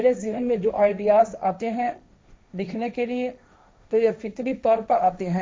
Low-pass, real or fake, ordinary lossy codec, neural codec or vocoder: none; fake; none; codec, 16 kHz, 1.1 kbps, Voila-Tokenizer